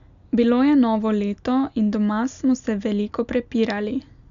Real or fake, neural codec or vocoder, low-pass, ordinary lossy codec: real; none; 7.2 kHz; none